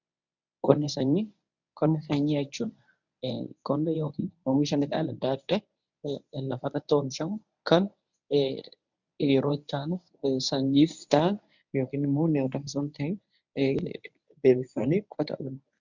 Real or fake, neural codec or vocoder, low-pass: fake; codec, 24 kHz, 0.9 kbps, WavTokenizer, medium speech release version 1; 7.2 kHz